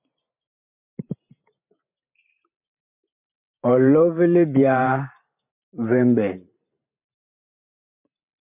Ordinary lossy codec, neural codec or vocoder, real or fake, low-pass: MP3, 32 kbps; vocoder, 44.1 kHz, 128 mel bands every 512 samples, BigVGAN v2; fake; 3.6 kHz